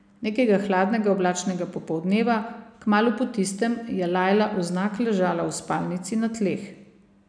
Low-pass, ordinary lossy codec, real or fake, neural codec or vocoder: 9.9 kHz; none; real; none